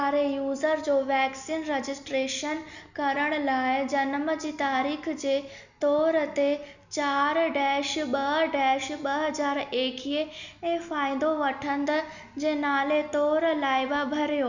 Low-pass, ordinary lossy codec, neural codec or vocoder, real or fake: 7.2 kHz; none; none; real